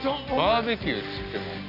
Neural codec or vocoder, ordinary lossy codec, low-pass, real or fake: none; none; 5.4 kHz; real